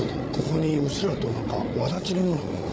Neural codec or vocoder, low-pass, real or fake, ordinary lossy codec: codec, 16 kHz, 8 kbps, FreqCodec, larger model; none; fake; none